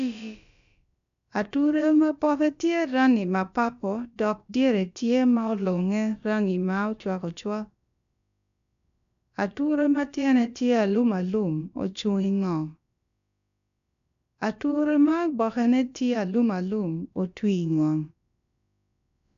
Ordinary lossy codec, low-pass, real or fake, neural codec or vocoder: MP3, 96 kbps; 7.2 kHz; fake; codec, 16 kHz, about 1 kbps, DyCAST, with the encoder's durations